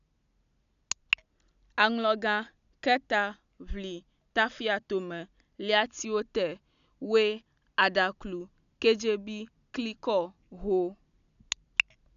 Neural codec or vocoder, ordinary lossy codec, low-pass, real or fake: none; none; 7.2 kHz; real